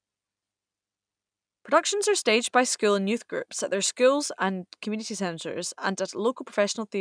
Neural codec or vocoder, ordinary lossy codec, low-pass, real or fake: none; none; 9.9 kHz; real